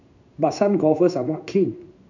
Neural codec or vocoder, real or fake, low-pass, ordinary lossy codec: codec, 16 kHz in and 24 kHz out, 1 kbps, XY-Tokenizer; fake; 7.2 kHz; none